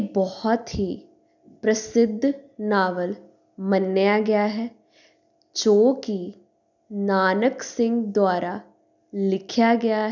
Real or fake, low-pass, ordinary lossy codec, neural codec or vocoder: real; 7.2 kHz; none; none